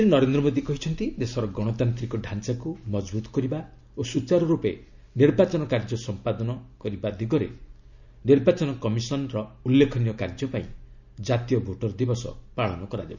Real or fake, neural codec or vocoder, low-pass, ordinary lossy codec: real; none; 7.2 kHz; none